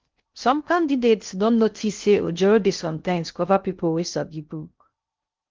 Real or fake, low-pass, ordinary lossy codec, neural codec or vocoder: fake; 7.2 kHz; Opus, 32 kbps; codec, 16 kHz in and 24 kHz out, 0.6 kbps, FocalCodec, streaming, 2048 codes